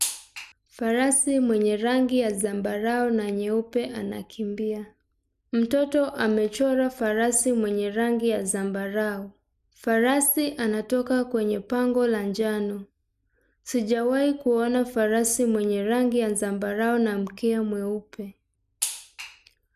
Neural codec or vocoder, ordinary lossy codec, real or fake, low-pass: none; none; real; 14.4 kHz